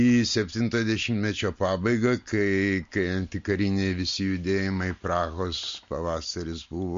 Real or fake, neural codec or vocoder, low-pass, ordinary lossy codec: real; none; 7.2 kHz; MP3, 48 kbps